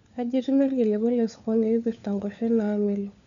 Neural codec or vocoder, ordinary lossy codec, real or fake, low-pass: codec, 16 kHz, 4 kbps, FunCodec, trained on LibriTTS, 50 frames a second; none; fake; 7.2 kHz